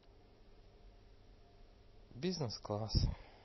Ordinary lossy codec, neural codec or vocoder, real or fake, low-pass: MP3, 24 kbps; none; real; 7.2 kHz